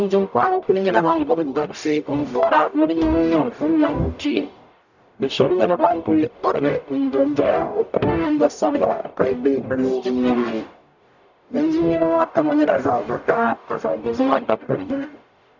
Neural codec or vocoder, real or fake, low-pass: codec, 44.1 kHz, 0.9 kbps, DAC; fake; 7.2 kHz